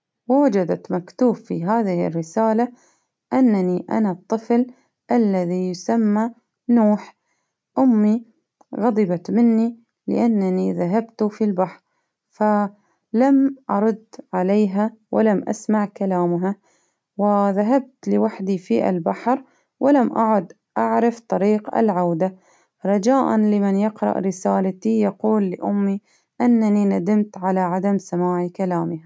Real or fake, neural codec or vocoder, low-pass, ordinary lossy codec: real; none; none; none